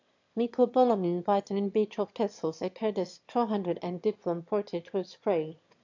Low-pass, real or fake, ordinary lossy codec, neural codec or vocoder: 7.2 kHz; fake; AAC, 48 kbps; autoencoder, 22.05 kHz, a latent of 192 numbers a frame, VITS, trained on one speaker